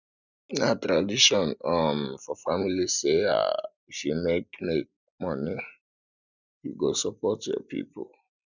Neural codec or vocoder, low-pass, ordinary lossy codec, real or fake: none; 7.2 kHz; none; real